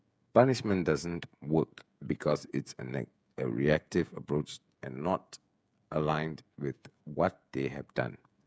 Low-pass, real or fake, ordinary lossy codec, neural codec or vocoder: none; fake; none; codec, 16 kHz, 16 kbps, FreqCodec, smaller model